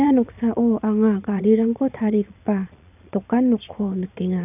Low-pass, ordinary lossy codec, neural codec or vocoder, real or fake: 3.6 kHz; none; vocoder, 22.05 kHz, 80 mel bands, Vocos; fake